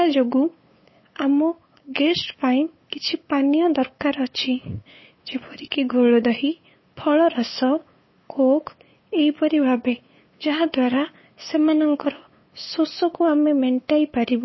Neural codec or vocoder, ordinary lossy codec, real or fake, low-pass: codec, 16 kHz, 8 kbps, FunCodec, trained on LibriTTS, 25 frames a second; MP3, 24 kbps; fake; 7.2 kHz